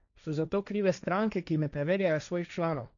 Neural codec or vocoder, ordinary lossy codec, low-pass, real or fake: codec, 16 kHz, 1.1 kbps, Voila-Tokenizer; none; 7.2 kHz; fake